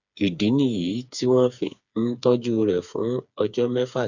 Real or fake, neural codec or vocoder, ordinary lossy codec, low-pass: fake; codec, 16 kHz, 4 kbps, FreqCodec, smaller model; none; 7.2 kHz